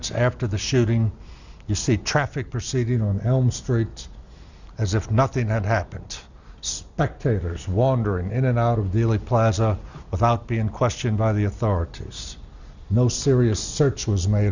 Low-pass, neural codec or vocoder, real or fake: 7.2 kHz; none; real